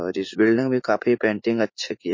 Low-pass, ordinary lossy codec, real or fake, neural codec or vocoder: 7.2 kHz; MP3, 32 kbps; fake; vocoder, 44.1 kHz, 80 mel bands, Vocos